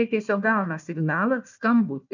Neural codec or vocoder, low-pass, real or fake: codec, 16 kHz, 1 kbps, FunCodec, trained on Chinese and English, 50 frames a second; 7.2 kHz; fake